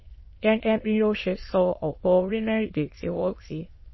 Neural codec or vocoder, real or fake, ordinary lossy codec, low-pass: autoencoder, 22.05 kHz, a latent of 192 numbers a frame, VITS, trained on many speakers; fake; MP3, 24 kbps; 7.2 kHz